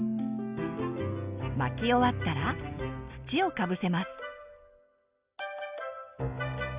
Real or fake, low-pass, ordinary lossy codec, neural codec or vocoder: real; 3.6 kHz; Opus, 64 kbps; none